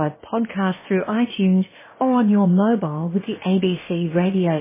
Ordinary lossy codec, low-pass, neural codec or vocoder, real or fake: MP3, 16 kbps; 3.6 kHz; codec, 16 kHz, about 1 kbps, DyCAST, with the encoder's durations; fake